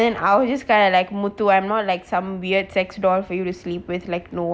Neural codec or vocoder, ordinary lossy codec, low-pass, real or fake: none; none; none; real